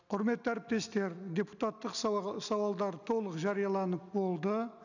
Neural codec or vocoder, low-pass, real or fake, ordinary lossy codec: none; 7.2 kHz; real; none